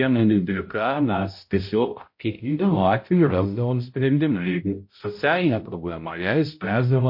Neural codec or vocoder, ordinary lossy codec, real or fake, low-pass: codec, 16 kHz, 0.5 kbps, X-Codec, HuBERT features, trained on balanced general audio; MP3, 32 kbps; fake; 5.4 kHz